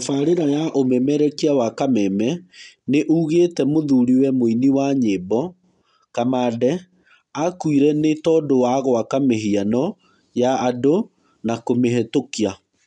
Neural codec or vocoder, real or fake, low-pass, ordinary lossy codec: none; real; 10.8 kHz; none